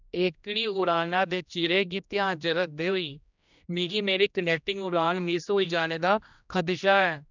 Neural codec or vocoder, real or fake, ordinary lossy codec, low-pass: codec, 16 kHz, 1 kbps, X-Codec, HuBERT features, trained on general audio; fake; none; 7.2 kHz